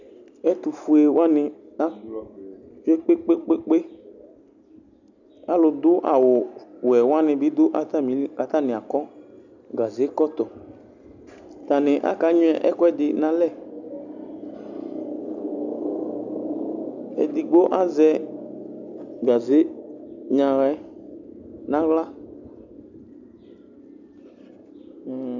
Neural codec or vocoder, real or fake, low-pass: none; real; 7.2 kHz